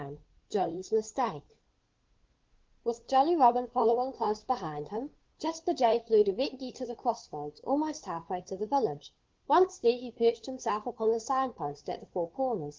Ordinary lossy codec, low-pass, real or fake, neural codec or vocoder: Opus, 16 kbps; 7.2 kHz; fake; codec, 16 kHz, 2 kbps, FunCodec, trained on Chinese and English, 25 frames a second